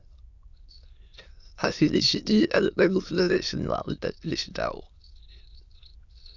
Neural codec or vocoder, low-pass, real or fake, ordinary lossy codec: autoencoder, 22.05 kHz, a latent of 192 numbers a frame, VITS, trained on many speakers; 7.2 kHz; fake; Opus, 64 kbps